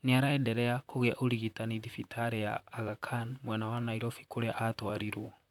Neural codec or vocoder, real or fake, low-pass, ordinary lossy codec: vocoder, 44.1 kHz, 128 mel bands, Pupu-Vocoder; fake; 19.8 kHz; none